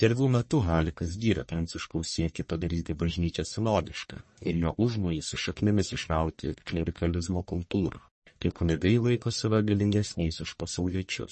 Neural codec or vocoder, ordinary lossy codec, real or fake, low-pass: codec, 44.1 kHz, 1.7 kbps, Pupu-Codec; MP3, 32 kbps; fake; 10.8 kHz